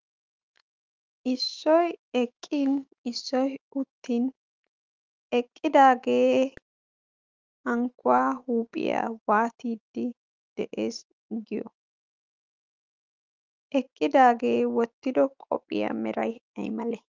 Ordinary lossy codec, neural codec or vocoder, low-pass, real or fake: Opus, 24 kbps; autoencoder, 48 kHz, 128 numbers a frame, DAC-VAE, trained on Japanese speech; 7.2 kHz; fake